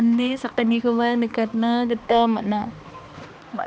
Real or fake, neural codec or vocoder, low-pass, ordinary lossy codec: fake; codec, 16 kHz, 2 kbps, X-Codec, HuBERT features, trained on balanced general audio; none; none